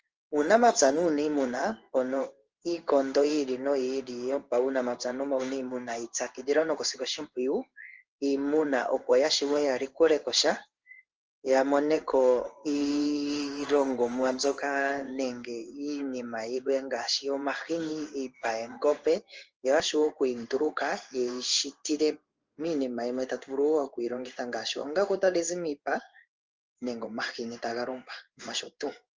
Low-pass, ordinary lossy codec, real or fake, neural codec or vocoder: 7.2 kHz; Opus, 24 kbps; fake; codec, 16 kHz in and 24 kHz out, 1 kbps, XY-Tokenizer